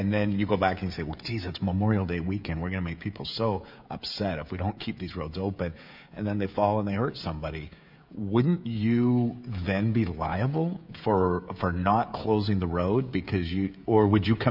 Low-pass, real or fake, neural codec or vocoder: 5.4 kHz; fake; codec, 16 kHz, 16 kbps, FreqCodec, smaller model